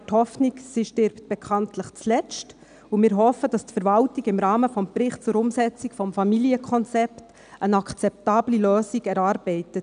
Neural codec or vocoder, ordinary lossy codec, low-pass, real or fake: none; none; 9.9 kHz; real